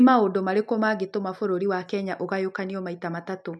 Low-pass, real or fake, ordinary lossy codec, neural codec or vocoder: none; real; none; none